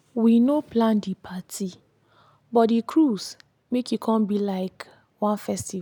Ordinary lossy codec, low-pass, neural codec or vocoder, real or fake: none; 19.8 kHz; none; real